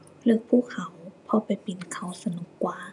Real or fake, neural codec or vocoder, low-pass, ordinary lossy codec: fake; vocoder, 48 kHz, 128 mel bands, Vocos; 10.8 kHz; none